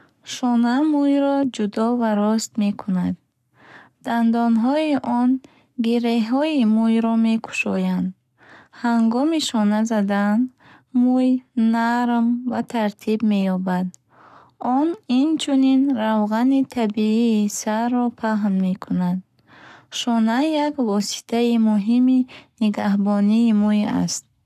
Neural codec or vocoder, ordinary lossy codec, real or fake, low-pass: codec, 44.1 kHz, 7.8 kbps, Pupu-Codec; none; fake; 14.4 kHz